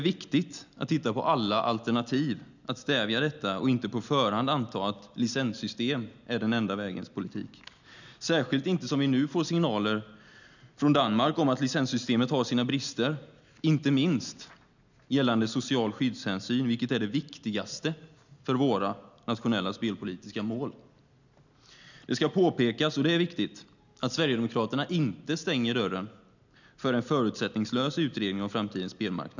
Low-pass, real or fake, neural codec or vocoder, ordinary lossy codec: 7.2 kHz; real; none; none